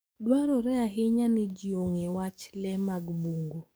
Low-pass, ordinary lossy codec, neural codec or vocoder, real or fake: none; none; codec, 44.1 kHz, 7.8 kbps, DAC; fake